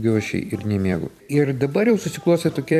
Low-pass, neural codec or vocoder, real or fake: 14.4 kHz; vocoder, 44.1 kHz, 128 mel bands every 256 samples, BigVGAN v2; fake